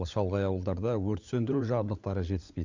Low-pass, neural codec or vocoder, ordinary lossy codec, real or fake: 7.2 kHz; codec, 16 kHz, 16 kbps, FunCodec, trained on LibriTTS, 50 frames a second; MP3, 64 kbps; fake